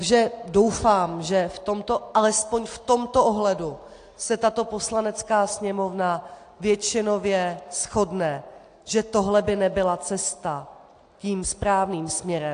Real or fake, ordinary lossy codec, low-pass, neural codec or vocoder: real; AAC, 48 kbps; 9.9 kHz; none